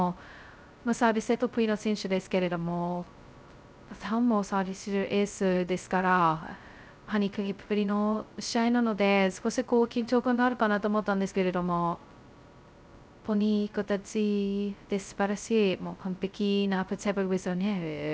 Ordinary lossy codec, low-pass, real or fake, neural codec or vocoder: none; none; fake; codec, 16 kHz, 0.2 kbps, FocalCodec